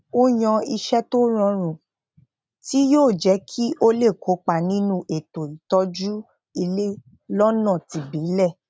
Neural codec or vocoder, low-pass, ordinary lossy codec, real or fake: none; none; none; real